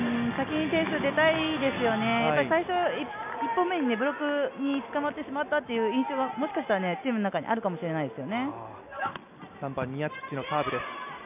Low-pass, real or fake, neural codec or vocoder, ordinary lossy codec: 3.6 kHz; real; none; none